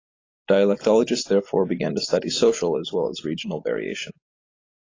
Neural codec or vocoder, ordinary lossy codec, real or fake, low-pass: none; AAC, 32 kbps; real; 7.2 kHz